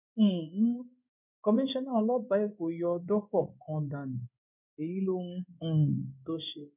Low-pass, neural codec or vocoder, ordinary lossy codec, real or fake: 3.6 kHz; codec, 16 kHz in and 24 kHz out, 1 kbps, XY-Tokenizer; none; fake